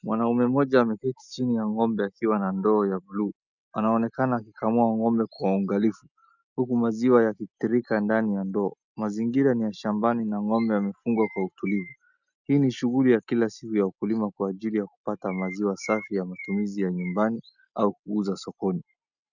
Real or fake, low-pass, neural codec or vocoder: real; 7.2 kHz; none